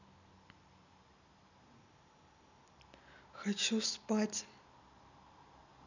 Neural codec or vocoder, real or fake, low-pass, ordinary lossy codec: none; real; 7.2 kHz; none